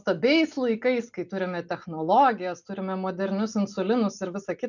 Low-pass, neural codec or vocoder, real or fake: 7.2 kHz; none; real